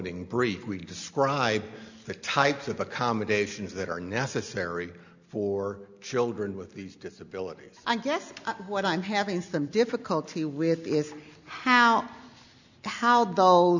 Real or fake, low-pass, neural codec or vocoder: real; 7.2 kHz; none